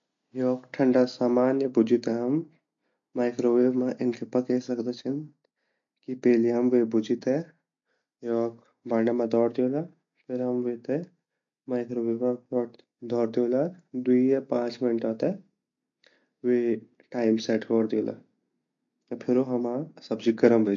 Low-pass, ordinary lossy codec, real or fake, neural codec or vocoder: 7.2 kHz; MP3, 48 kbps; real; none